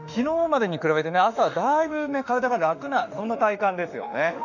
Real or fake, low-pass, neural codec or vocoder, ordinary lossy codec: fake; 7.2 kHz; autoencoder, 48 kHz, 32 numbers a frame, DAC-VAE, trained on Japanese speech; none